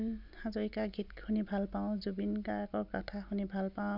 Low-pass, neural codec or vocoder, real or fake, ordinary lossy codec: 5.4 kHz; none; real; none